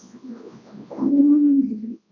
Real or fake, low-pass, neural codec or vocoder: fake; 7.2 kHz; codec, 24 kHz, 0.9 kbps, WavTokenizer, large speech release